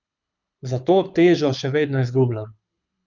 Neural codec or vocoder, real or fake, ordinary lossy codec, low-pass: codec, 24 kHz, 6 kbps, HILCodec; fake; none; 7.2 kHz